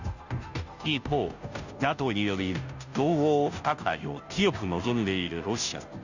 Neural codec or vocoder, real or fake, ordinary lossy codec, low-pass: codec, 16 kHz, 0.5 kbps, FunCodec, trained on Chinese and English, 25 frames a second; fake; none; 7.2 kHz